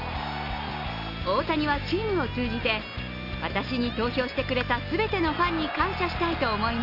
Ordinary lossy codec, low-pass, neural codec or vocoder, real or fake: none; 5.4 kHz; none; real